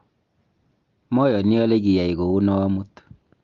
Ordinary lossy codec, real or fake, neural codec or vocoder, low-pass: Opus, 32 kbps; real; none; 7.2 kHz